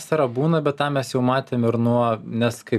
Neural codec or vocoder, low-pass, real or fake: none; 14.4 kHz; real